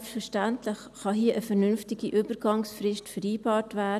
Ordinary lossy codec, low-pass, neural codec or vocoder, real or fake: none; 14.4 kHz; none; real